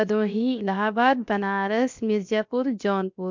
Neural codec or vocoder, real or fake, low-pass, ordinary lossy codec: codec, 16 kHz, 0.7 kbps, FocalCodec; fake; 7.2 kHz; MP3, 64 kbps